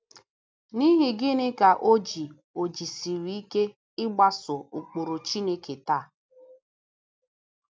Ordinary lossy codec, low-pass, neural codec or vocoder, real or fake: none; none; none; real